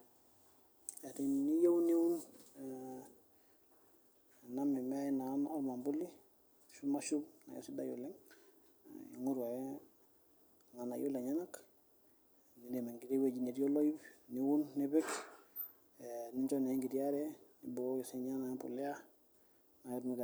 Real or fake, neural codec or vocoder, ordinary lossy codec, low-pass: real; none; none; none